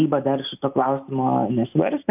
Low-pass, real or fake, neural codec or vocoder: 3.6 kHz; real; none